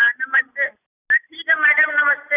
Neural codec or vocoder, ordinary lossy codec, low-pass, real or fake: none; none; 3.6 kHz; real